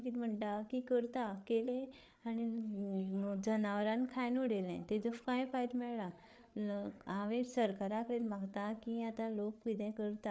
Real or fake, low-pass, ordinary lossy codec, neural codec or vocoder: fake; none; none; codec, 16 kHz, 8 kbps, FreqCodec, larger model